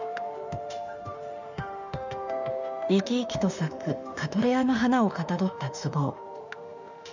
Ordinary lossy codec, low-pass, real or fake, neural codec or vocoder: none; 7.2 kHz; fake; autoencoder, 48 kHz, 32 numbers a frame, DAC-VAE, trained on Japanese speech